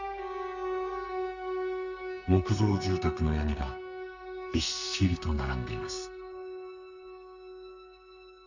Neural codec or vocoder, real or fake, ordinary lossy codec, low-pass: codec, 32 kHz, 1.9 kbps, SNAC; fake; none; 7.2 kHz